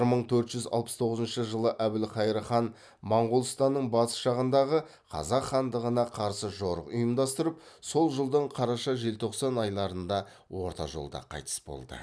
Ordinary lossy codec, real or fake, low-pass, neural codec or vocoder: none; real; none; none